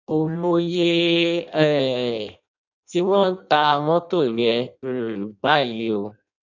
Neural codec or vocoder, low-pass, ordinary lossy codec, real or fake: codec, 16 kHz in and 24 kHz out, 0.6 kbps, FireRedTTS-2 codec; 7.2 kHz; none; fake